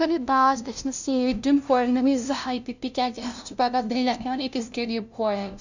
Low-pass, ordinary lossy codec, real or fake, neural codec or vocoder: 7.2 kHz; none; fake; codec, 16 kHz, 0.5 kbps, FunCodec, trained on LibriTTS, 25 frames a second